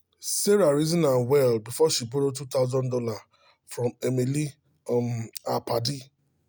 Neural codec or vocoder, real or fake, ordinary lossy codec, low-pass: none; real; none; none